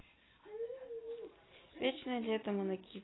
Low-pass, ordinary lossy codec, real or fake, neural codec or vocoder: 7.2 kHz; AAC, 16 kbps; real; none